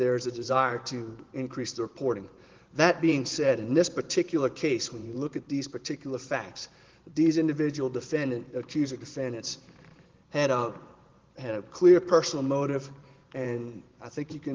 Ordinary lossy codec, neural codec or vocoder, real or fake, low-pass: Opus, 16 kbps; vocoder, 44.1 kHz, 128 mel bands every 512 samples, BigVGAN v2; fake; 7.2 kHz